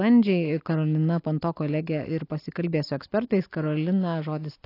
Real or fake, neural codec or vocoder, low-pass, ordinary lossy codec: real; none; 5.4 kHz; AAC, 32 kbps